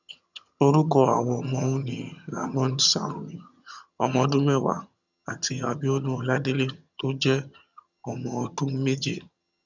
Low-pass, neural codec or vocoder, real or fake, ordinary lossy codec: 7.2 kHz; vocoder, 22.05 kHz, 80 mel bands, HiFi-GAN; fake; none